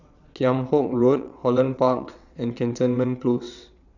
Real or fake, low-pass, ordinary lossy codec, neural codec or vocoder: fake; 7.2 kHz; none; vocoder, 22.05 kHz, 80 mel bands, WaveNeXt